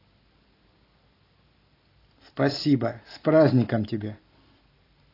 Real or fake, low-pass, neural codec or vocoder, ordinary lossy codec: real; 5.4 kHz; none; AAC, 24 kbps